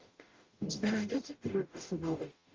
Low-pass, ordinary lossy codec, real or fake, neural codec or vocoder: 7.2 kHz; Opus, 32 kbps; fake; codec, 44.1 kHz, 0.9 kbps, DAC